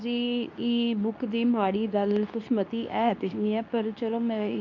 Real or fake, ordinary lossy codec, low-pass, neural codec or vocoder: fake; none; 7.2 kHz; codec, 24 kHz, 0.9 kbps, WavTokenizer, medium speech release version 2